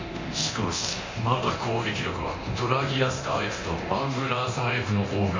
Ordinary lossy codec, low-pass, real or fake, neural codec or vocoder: MP3, 48 kbps; 7.2 kHz; fake; codec, 24 kHz, 0.9 kbps, DualCodec